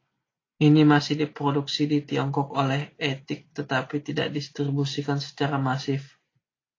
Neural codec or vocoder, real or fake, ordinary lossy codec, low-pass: none; real; AAC, 32 kbps; 7.2 kHz